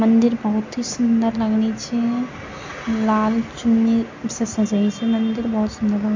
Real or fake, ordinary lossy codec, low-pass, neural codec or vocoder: real; MP3, 48 kbps; 7.2 kHz; none